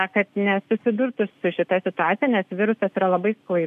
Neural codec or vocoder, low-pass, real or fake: none; 14.4 kHz; real